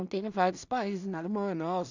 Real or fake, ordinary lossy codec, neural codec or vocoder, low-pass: fake; none; codec, 16 kHz in and 24 kHz out, 0.4 kbps, LongCat-Audio-Codec, two codebook decoder; 7.2 kHz